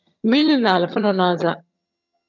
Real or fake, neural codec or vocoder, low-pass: fake; vocoder, 22.05 kHz, 80 mel bands, HiFi-GAN; 7.2 kHz